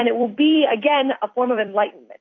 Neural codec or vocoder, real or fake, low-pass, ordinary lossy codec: vocoder, 44.1 kHz, 128 mel bands every 256 samples, BigVGAN v2; fake; 7.2 kHz; AAC, 48 kbps